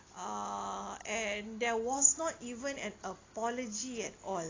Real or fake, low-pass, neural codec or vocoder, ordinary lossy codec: real; 7.2 kHz; none; AAC, 32 kbps